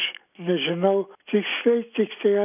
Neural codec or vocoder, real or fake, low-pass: none; real; 3.6 kHz